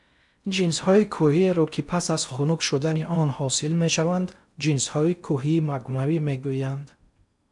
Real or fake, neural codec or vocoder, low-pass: fake; codec, 16 kHz in and 24 kHz out, 0.6 kbps, FocalCodec, streaming, 4096 codes; 10.8 kHz